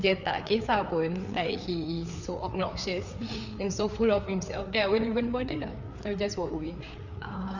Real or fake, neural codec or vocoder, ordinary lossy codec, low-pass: fake; codec, 16 kHz, 4 kbps, FreqCodec, larger model; none; 7.2 kHz